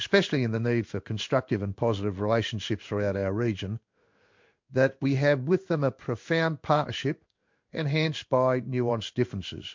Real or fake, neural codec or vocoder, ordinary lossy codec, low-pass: fake; codec, 16 kHz in and 24 kHz out, 1 kbps, XY-Tokenizer; MP3, 48 kbps; 7.2 kHz